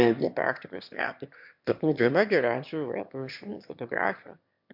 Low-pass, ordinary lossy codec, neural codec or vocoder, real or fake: 5.4 kHz; MP3, 48 kbps; autoencoder, 22.05 kHz, a latent of 192 numbers a frame, VITS, trained on one speaker; fake